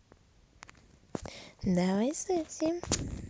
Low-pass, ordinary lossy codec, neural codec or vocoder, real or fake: none; none; none; real